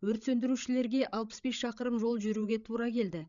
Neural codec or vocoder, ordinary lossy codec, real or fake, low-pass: codec, 16 kHz, 8 kbps, FreqCodec, larger model; none; fake; 7.2 kHz